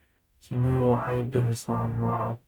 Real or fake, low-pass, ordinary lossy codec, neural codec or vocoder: fake; 19.8 kHz; none; codec, 44.1 kHz, 0.9 kbps, DAC